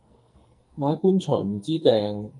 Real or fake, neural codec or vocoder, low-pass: fake; codec, 44.1 kHz, 2.6 kbps, SNAC; 10.8 kHz